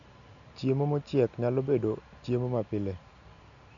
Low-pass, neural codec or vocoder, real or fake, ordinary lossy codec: 7.2 kHz; none; real; none